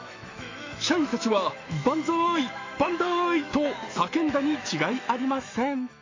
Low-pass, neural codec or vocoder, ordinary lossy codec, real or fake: 7.2 kHz; autoencoder, 48 kHz, 128 numbers a frame, DAC-VAE, trained on Japanese speech; AAC, 32 kbps; fake